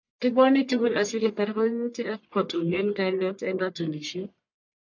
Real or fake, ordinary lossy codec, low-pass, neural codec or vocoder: fake; MP3, 64 kbps; 7.2 kHz; codec, 44.1 kHz, 1.7 kbps, Pupu-Codec